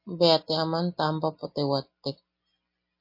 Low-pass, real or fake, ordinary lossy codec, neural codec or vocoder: 5.4 kHz; real; MP3, 32 kbps; none